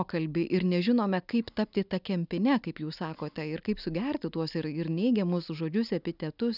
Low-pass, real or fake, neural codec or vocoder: 5.4 kHz; real; none